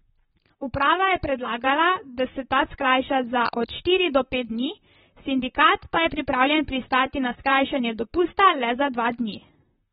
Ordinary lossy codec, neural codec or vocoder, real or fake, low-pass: AAC, 16 kbps; vocoder, 44.1 kHz, 128 mel bands, Pupu-Vocoder; fake; 19.8 kHz